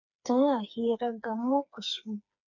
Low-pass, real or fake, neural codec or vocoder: 7.2 kHz; fake; codec, 16 kHz, 4 kbps, FreqCodec, smaller model